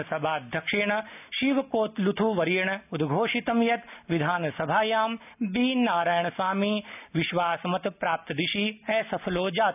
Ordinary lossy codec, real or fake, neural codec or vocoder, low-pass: none; real; none; 3.6 kHz